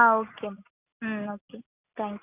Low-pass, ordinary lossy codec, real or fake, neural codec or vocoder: 3.6 kHz; none; real; none